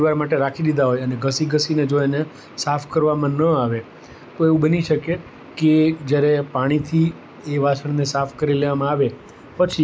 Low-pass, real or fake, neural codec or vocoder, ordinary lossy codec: none; real; none; none